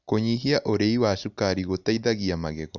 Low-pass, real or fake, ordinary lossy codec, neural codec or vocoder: 7.2 kHz; real; none; none